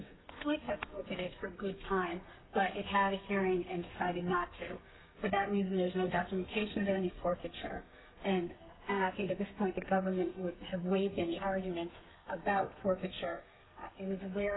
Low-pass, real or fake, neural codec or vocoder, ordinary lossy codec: 7.2 kHz; fake; codec, 32 kHz, 1.9 kbps, SNAC; AAC, 16 kbps